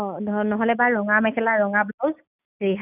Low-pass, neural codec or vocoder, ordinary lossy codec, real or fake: 3.6 kHz; none; none; real